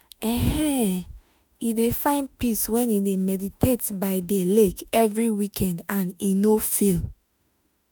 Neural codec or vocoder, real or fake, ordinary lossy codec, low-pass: autoencoder, 48 kHz, 32 numbers a frame, DAC-VAE, trained on Japanese speech; fake; none; none